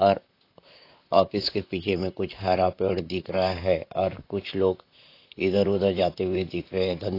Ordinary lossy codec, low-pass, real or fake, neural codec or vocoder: AAC, 32 kbps; 5.4 kHz; fake; vocoder, 44.1 kHz, 128 mel bands, Pupu-Vocoder